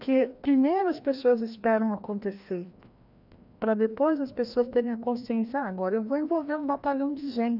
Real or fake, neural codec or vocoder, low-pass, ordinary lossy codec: fake; codec, 16 kHz, 1 kbps, FreqCodec, larger model; 5.4 kHz; none